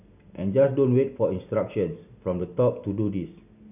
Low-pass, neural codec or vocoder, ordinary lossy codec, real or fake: 3.6 kHz; none; none; real